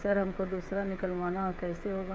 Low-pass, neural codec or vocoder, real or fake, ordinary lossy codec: none; codec, 16 kHz, 16 kbps, FreqCodec, smaller model; fake; none